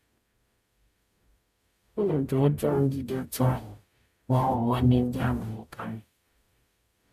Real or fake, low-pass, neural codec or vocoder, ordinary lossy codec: fake; 14.4 kHz; codec, 44.1 kHz, 0.9 kbps, DAC; MP3, 96 kbps